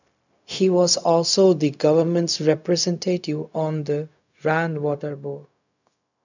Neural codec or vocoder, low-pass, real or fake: codec, 16 kHz, 0.4 kbps, LongCat-Audio-Codec; 7.2 kHz; fake